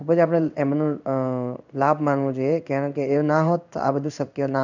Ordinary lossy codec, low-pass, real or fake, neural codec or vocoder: none; 7.2 kHz; fake; codec, 16 kHz in and 24 kHz out, 1 kbps, XY-Tokenizer